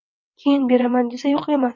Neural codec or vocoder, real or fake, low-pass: vocoder, 22.05 kHz, 80 mel bands, WaveNeXt; fake; 7.2 kHz